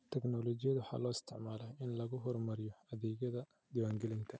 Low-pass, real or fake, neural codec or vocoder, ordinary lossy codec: none; real; none; none